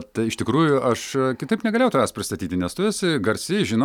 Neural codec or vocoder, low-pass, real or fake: none; 19.8 kHz; real